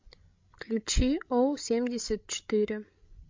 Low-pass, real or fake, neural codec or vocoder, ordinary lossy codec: 7.2 kHz; fake; codec, 16 kHz, 16 kbps, FreqCodec, larger model; MP3, 48 kbps